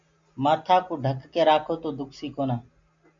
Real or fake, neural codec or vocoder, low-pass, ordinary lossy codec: real; none; 7.2 kHz; MP3, 48 kbps